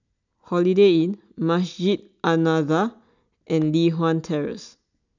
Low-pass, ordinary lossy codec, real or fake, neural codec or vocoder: 7.2 kHz; none; real; none